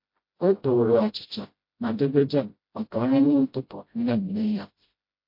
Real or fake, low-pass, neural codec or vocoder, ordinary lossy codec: fake; 5.4 kHz; codec, 16 kHz, 0.5 kbps, FreqCodec, smaller model; MP3, 32 kbps